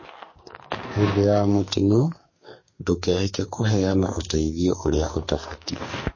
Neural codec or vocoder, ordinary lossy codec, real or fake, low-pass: codec, 44.1 kHz, 2.6 kbps, SNAC; MP3, 32 kbps; fake; 7.2 kHz